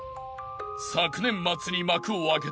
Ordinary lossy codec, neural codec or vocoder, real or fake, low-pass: none; none; real; none